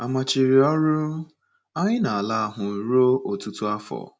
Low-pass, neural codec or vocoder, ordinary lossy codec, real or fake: none; none; none; real